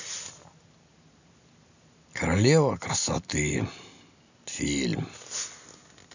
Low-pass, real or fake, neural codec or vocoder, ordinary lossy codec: 7.2 kHz; real; none; none